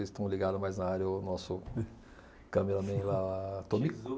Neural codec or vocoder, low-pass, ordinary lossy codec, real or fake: none; none; none; real